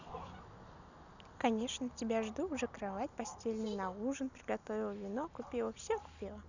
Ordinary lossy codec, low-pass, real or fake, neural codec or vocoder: none; 7.2 kHz; real; none